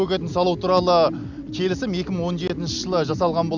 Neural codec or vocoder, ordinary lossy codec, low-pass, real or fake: none; none; 7.2 kHz; real